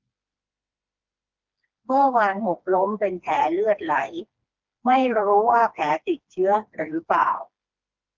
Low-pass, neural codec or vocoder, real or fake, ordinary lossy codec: 7.2 kHz; codec, 16 kHz, 2 kbps, FreqCodec, smaller model; fake; Opus, 32 kbps